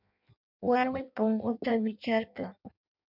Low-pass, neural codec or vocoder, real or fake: 5.4 kHz; codec, 16 kHz in and 24 kHz out, 0.6 kbps, FireRedTTS-2 codec; fake